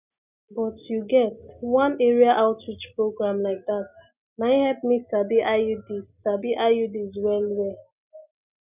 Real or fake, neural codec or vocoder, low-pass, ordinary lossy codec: real; none; 3.6 kHz; none